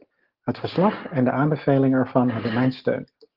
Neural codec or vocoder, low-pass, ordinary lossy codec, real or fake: none; 5.4 kHz; Opus, 16 kbps; real